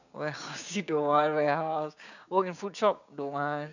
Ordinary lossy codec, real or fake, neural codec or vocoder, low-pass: none; fake; codec, 16 kHz, 6 kbps, DAC; 7.2 kHz